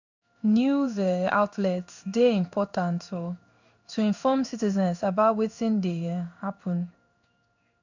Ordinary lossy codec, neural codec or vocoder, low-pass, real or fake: none; codec, 16 kHz in and 24 kHz out, 1 kbps, XY-Tokenizer; 7.2 kHz; fake